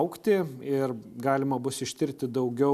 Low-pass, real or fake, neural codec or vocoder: 14.4 kHz; real; none